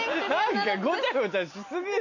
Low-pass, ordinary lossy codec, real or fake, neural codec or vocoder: 7.2 kHz; none; real; none